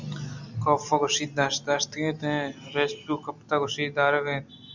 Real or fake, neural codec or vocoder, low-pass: real; none; 7.2 kHz